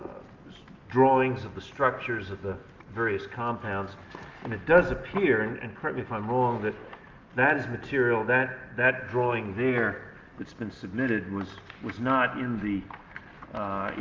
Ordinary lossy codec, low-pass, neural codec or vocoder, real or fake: Opus, 32 kbps; 7.2 kHz; none; real